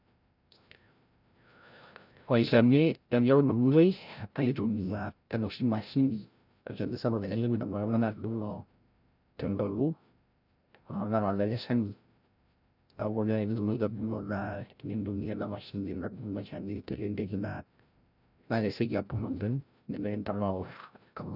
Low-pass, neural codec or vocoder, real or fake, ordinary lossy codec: 5.4 kHz; codec, 16 kHz, 0.5 kbps, FreqCodec, larger model; fake; none